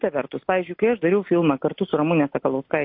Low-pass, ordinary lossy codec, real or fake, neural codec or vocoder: 5.4 kHz; MP3, 32 kbps; real; none